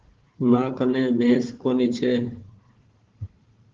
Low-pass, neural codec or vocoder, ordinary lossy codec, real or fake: 7.2 kHz; codec, 16 kHz, 4 kbps, FunCodec, trained on Chinese and English, 50 frames a second; Opus, 16 kbps; fake